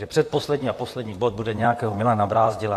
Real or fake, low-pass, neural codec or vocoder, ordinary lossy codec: fake; 14.4 kHz; vocoder, 44.1 kHz, 128 mel bands, Pupu-Vocoder; AAC, 64 kbps